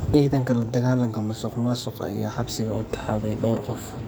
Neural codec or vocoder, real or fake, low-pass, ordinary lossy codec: codec, 44.1 kHz, 2.6 kbps, SNAC; fake; none; none